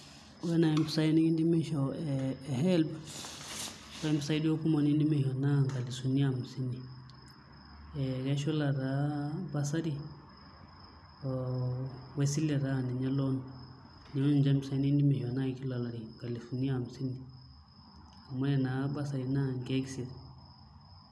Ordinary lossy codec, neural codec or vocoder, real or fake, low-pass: none; none; real; none